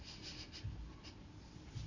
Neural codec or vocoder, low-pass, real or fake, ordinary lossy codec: none; 7.2 kHz; real; Opus, 64 kbps